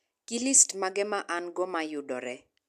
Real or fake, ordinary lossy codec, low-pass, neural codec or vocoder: real; AAC, 96 kbps; 14.4 kHz; none